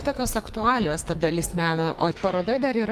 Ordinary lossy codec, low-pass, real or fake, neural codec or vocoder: Opus, 32 kbps; 14.4 kHz; fake; codec, 44.1 kHz, 2.6 kbps, SNAC